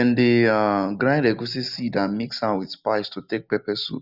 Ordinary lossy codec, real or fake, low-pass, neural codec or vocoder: none; real; 5.4 kHz; none